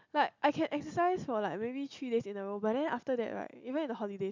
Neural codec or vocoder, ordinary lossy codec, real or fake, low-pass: none; MP3, 64 kbps; real; 7.2 kHz